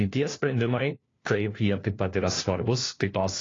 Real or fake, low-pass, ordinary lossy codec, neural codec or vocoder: fake; 7.2 kHz; AAC, 32 kbps; codec, 16 kHz, 1 kbps, FunCodec, trained on LibriTTS, 50 frames a second